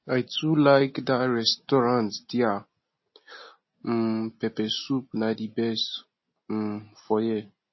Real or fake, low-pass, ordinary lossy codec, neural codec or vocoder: real; 7.2 kHz; MP3, 24 kbps; none